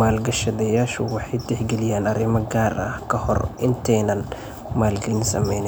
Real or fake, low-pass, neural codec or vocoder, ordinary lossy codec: real; none; none; none